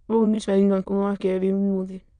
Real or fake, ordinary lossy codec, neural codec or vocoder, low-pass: fake; none; autoencoder, 22.05 kHz, a latent of 192 numbers a frame, VITS, trained on many speakers; 9.9 kHz